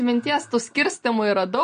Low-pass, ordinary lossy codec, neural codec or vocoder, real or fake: 9.9 kHz; MP3, 48 kbps; none; real